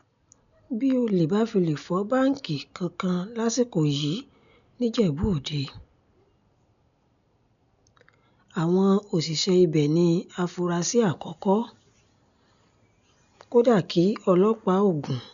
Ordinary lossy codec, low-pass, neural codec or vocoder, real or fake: none; 7.2 kHz; none; real